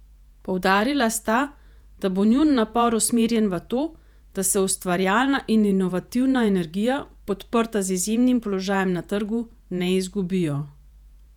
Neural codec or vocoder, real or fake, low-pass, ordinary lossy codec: vocoder, 48 kHz, 128 mel bands, Vocos; fake; 19.8 kHz; none